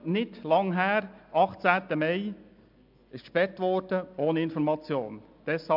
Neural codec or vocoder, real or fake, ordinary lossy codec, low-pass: none; real; MP3, 48 kbps; 5.4 kHz